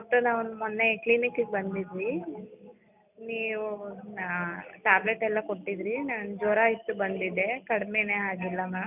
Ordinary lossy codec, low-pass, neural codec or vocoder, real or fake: none; 3.6 kHz; none; real